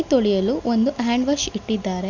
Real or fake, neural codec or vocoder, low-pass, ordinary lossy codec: real; none; 7.2 kHz; none